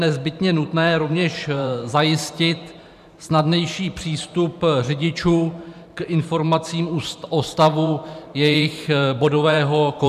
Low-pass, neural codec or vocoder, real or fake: 14.4 kHz; vocoder, 44.1 kHz, 128 mel bands every 512 samples, BigVGAN v2; fake